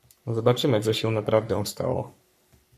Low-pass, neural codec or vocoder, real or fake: 14.4 kHz; codec, 44.1 kHz, 3.4 kbps, Pupu-Codec; fake